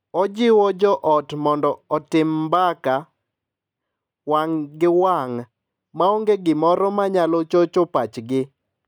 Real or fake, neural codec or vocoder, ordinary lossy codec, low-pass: real; none; none; 19.8 kHz